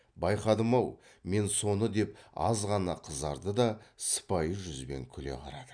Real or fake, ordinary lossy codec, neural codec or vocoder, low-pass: real; none; none; 9.9 kHz